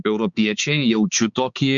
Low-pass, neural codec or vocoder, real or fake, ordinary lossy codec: 7.2 kHz; codec, 16 kHz, 4 kbps, X-Codec, HuBERT features, trained on balanced general audio; fake; Opus, 64 kbps